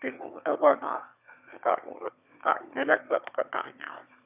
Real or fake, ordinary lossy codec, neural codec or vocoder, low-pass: fake; none; autoencoder, 22.05 kHz, a latent of 192 numbers a frame, VITS, trained on one speaker; 3.6 kHz